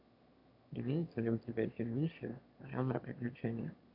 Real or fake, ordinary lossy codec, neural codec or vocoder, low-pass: fake; AAC, 48 kbps; autoencoder, 22.05 kHz, a latent of 192 numbers a frame, VITS, trained on one speaker; 5.4 kHz